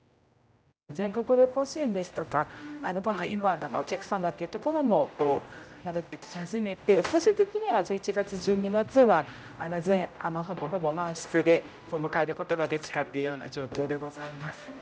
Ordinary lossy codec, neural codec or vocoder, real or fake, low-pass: none; codec, 16 kHz, 0.5 kbps, X-Codec, HuBERT features, trained on general audio; fake; none